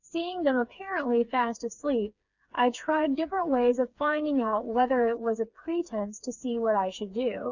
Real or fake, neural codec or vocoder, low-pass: fake; codec, 16 kHz, 4 kbps, FreqCodec, smaller model; 7.2 kHz